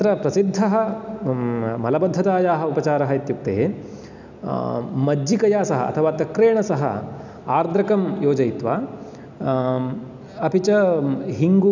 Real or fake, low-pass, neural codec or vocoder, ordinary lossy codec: real; 7.2 kHz; none; none